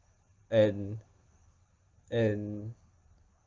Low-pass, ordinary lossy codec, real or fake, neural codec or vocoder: 7.2 kHz; Opus, 32 kbps; real; none